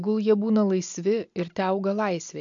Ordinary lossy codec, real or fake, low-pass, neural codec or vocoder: AAC, 64 kbps; real; 7.2 kHz; none